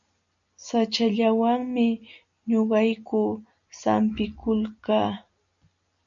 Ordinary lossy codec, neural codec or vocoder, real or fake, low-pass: MP3, 96 kbps; none; real; 7.2 kHz